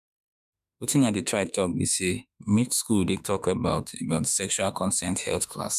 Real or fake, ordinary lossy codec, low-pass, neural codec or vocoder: fake; none; 14.4 kHz; autoencoder, 48 kHz, 32 numbers a frame, DAC-VAE, trained on Japanese speech